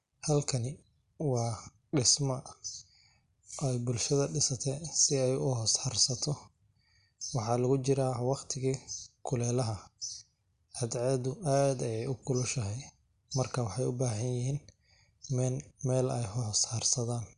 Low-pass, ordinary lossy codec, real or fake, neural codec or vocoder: 10.8 kHz; Opus, 64 kbps; real; none